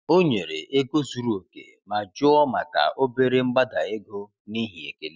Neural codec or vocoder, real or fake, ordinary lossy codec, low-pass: none; real; none; none